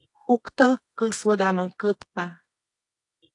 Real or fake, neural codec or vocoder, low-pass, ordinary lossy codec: fake; codec, 24 kHz, 0.9 kbps, WavTokenizer, medium music audio release; 10.8 kHz; AAC, 48 kbps